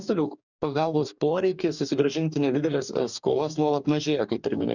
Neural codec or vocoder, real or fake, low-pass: codec, 44.1 kHz, 2.6 kbps, DAC; fake; 7.2 kHz